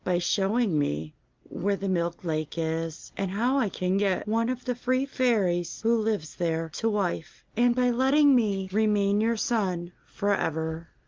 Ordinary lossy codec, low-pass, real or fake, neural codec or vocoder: Opus, 32 kbps; 7.2 kHz; real; none